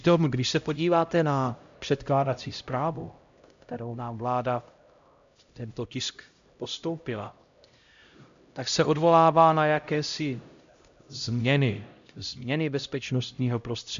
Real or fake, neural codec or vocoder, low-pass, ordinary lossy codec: fake; codec, 16 kHz, 0.5 kbps, X-Codec, HuBERT features, trained on LibriSpeech; 7.2 kHz; MP3, 64 kbps